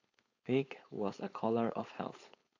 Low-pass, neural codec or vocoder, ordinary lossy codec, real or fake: 7.2 kHz; codec, 16 kHz, 4.8 kbps, FACodec; AAC, 48 kbps; fake